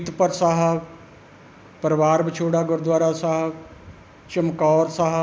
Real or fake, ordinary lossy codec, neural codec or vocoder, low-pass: real; none; none; none